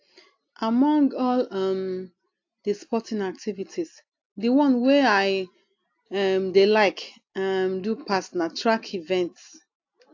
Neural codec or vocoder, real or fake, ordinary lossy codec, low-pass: none; real; AAC, 48 kbps; 7.2 kHz